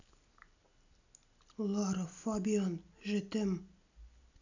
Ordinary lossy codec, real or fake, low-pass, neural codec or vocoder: none; real; 7.2 kHz; none